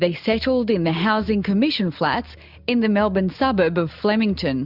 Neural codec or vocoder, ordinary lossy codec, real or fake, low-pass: none; Opus, 64 kbps; real; 5.4 kHz